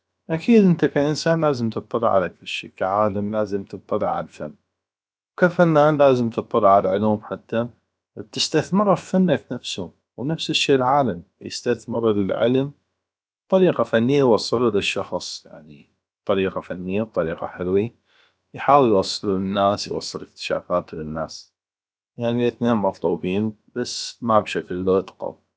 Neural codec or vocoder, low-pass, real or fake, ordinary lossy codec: codec, 16 kHz, about 1 kbps, DyCAST, with the encoder's durations; none; fake; none